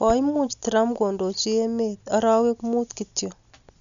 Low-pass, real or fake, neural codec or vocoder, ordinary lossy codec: 7.2 kHz; real; none; none